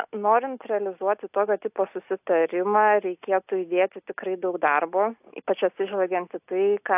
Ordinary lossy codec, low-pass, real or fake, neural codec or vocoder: AAC, 32 kbps; 3.6 kHz; real; none